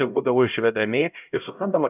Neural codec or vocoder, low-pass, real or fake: codec, 16 kHz, 0.5 kbps, X-Codec, HuBERT features, trained on LibriSpeech; 3.6 kHz; fake